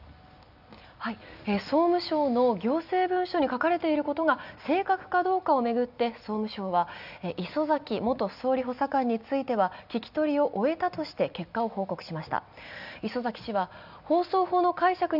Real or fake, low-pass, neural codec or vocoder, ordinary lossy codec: real; 5.4 kHz; none; none